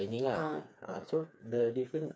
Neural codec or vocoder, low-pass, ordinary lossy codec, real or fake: codec, 16 kHz, 4 kbps, FreqCodec, smaller model; none; none; fake